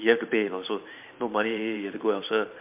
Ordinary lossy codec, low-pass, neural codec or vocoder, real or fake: MP3, 32 kbps; 3.6 kHz; vocoder, 44.1 kHz, 128 mel bands every 512 samples, BigVGAN v2; fake